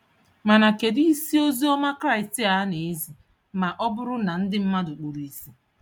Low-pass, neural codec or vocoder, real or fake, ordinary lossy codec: 19.8 kHz; none; real; MP3, 96 kbps